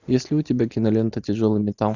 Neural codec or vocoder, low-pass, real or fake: none; 7.2 kHz; real